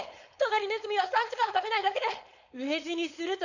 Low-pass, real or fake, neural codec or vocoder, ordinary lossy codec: 7.2 kHz; fake; codec, 16 kHz, 4.8 kbps, FACodec; none